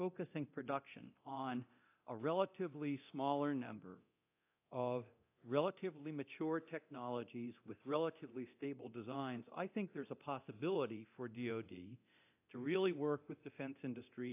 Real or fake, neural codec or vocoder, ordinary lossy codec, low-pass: fake; codec, 24 kHz, 0.9 kbps, DualCodec; AAC, 32 kbps; 3.6 kHz